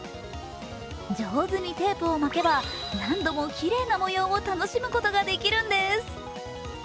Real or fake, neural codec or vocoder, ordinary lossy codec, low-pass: real; none; none; none